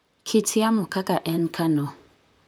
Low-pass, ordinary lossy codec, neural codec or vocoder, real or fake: none; none; vocoder, 44.1 kHz, 128 mel bands, Pupu-Vocoder; fake